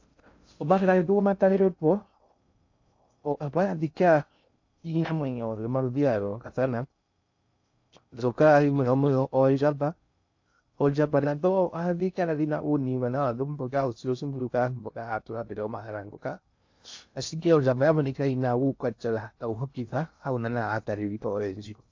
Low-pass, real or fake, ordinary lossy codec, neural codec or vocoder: 7.2 kHz; fake; AAC, 48 kbps; codec, 16 kHz in and 24 kHz out, 0.6 kbps, FocalCodec, streaming, 2048 codes